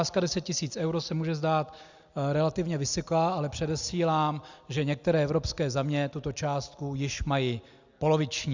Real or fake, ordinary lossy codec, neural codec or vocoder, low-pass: real; Opus, 64 kbps; none; 7.2 kHz